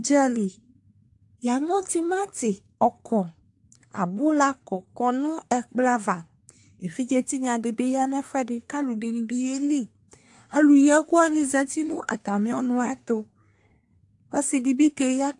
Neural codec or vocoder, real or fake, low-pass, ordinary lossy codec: codec, 24 kHz, 1 kbps, SNAC; fake; 10.8 kHz; AAC, 64 kbps